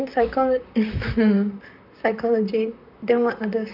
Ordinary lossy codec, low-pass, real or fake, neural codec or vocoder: none; 5.4 kHz; fake; vocoder, 44.1 kHz, 128 mel bands, Pupu-Vocoder